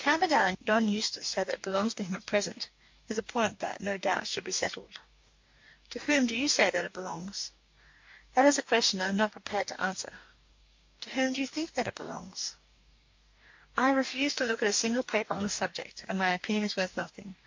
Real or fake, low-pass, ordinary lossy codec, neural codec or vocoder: fake; 7.2 kHz; MP3, 48 kbps; codec, 44.1 kHz, 2.6 kbps, DAC